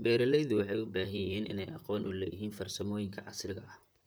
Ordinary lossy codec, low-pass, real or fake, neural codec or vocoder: none; none; fake; vocoder, 44.1 kHz, 128 mel bands, Pupu-Vocoder